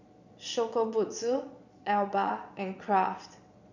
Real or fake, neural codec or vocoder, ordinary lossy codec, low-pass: fake; vocoder, 22.05 kHz, 80 mel bands, Vocos; none; 7.2 kHz